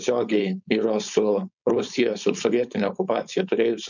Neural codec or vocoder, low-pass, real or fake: codec, 16 kHz, 4.8 kbps, FACodec; 7.2 kHz; fake